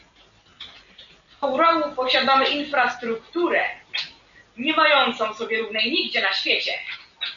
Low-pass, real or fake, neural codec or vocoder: 7.2 kHz; real; none